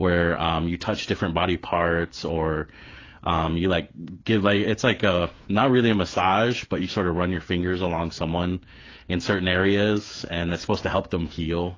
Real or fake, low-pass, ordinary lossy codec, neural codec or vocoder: fake; 7.2 kHz; AAC, 32 kbps; codec, 16 kHz, 8 kbps, FreqCodec, smaller model